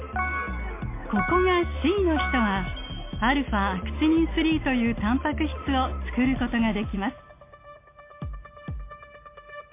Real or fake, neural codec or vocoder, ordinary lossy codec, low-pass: real; none; MP3, 24 kbps; 3.6 kHz